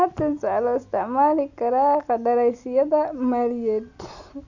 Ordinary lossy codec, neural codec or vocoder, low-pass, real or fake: none; none; 7.2 kHz; real